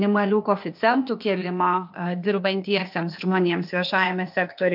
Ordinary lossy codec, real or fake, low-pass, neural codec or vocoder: MP3, 48 kbps; fake; 5.4 kHz; codec, 16 kHz, 0.8 kbps, ZipCodec